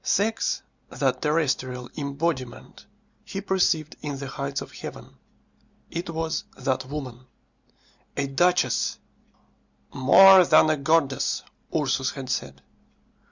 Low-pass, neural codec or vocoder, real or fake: 7.2 kHz; none; real